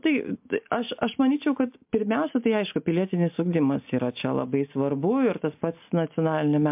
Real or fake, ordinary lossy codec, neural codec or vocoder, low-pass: real; MP3, 32 kbps; none; 3.6 kHz